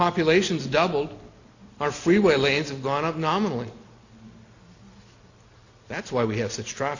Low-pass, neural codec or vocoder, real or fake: 7.2 kHz; none; real